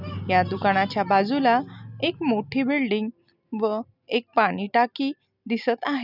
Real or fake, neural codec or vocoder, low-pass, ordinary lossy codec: real; none; 5.4 kHz; none